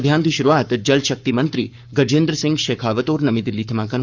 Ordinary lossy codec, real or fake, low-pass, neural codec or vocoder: none; fake; 7.2 kHz; codec, 44.1 kHz, 7.8 kbps, Pupu-Codec